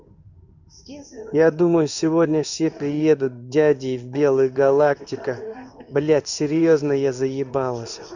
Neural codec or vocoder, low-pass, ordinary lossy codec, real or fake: codec, 16 kHz in and 24 kHz out, 1 kbps, XY-Tokenizer; 7.2 kHz; none; fake